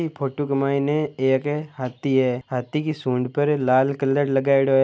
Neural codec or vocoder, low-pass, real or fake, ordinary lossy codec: none; none; real; none